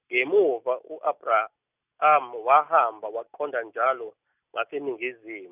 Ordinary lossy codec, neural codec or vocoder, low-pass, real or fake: none; none; 3.6 kHz; real